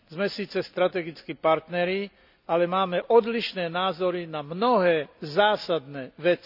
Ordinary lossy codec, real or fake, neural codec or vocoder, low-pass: none; real; none; 5.4 kHz